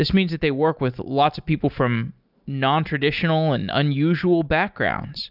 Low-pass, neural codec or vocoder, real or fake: 5.4 kHz; none; real